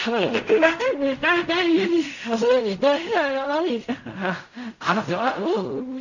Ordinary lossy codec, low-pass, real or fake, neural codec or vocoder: none; 7.2 kHz; fake; codec, 16 kHz in and 24 kHz out, 0.4 kbps, LongCat-Audio-Codec, fine tuned four codebook decoder